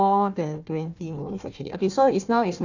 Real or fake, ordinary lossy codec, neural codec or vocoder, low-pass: fake; none; codec, 16 kHz, 1 kbps, FunCodec, trained on Chinese and English, 50 frames a second; 7.2 kHz